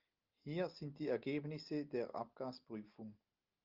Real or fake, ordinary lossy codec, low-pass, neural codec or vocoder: real; Opus, 24 kbps; 5.4 kHz; none